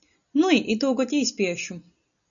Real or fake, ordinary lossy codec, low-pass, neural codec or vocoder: real; MP3, 96 kbps; 7.2 kHz; none